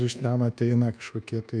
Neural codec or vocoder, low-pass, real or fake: codec, 24 kHz, 1.2 kbps, DualCodec; 9.9 kHz; fake